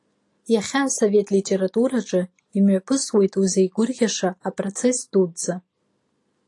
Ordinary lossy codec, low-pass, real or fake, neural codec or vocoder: AAC, 48 kbps; 10.8 kHz; real; none